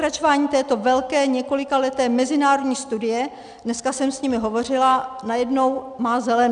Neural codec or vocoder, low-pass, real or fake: none; 9.9 kHz; real